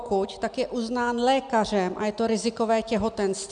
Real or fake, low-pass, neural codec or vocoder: real; 9.9 kHz; none